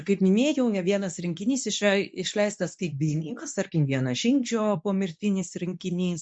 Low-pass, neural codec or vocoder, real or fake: 9.9 kHz; codec, 24 kHz, 0.9 kbps, WavTokenizer, medium speech release version 2; fake